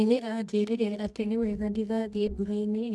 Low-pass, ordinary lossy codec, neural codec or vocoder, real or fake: none; none; codec, 24 kHz, 0.9 kbps, WavTokenizer, medium music audio release; fake